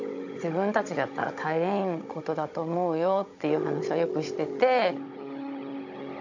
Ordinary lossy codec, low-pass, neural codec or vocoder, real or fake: AAC, 48 kbps; 7.2 kHz; codec, 16 kHz, 16 kbps, FunCodec, trained on Chinese and English, 50 frames a second; fake